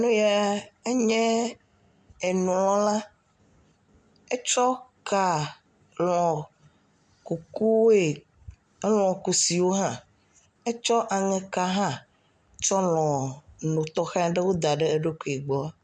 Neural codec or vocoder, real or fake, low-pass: none; real; 9.9 kHz